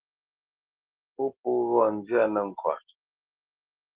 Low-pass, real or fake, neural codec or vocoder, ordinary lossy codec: 3.6 kHz; real; none; Opus, 16 kbps